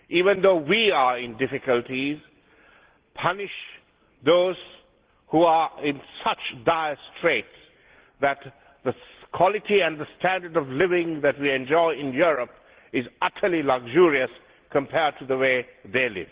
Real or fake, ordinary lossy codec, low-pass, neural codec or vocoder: real; Opus, 16 kbps; 3.6 kHz; none